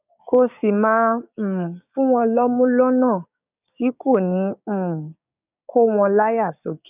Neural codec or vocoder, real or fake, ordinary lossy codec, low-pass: codec, 16 kHz, 6 kbps, DAC; fake; none; 3.6 kHz